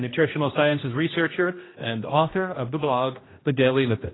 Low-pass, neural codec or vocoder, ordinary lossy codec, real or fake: 7.2 kHz; codec, 16 kHz, 1 kbps, X-Codec, HuBERT features, trained on general audio; AAC, 16 kbps; fake